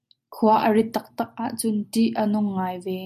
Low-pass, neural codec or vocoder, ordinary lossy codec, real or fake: 14.4 kHz; none; MP3, 64 kbps; real